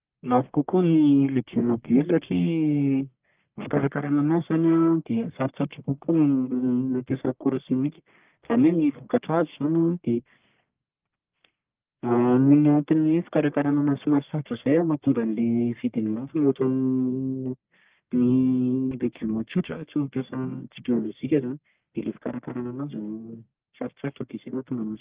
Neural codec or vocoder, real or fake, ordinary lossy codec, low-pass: codec, 44.1 kHz, 1.7 kbps, Pupu-Codec; fake; Opus, 24 kbps; 3.6 kHz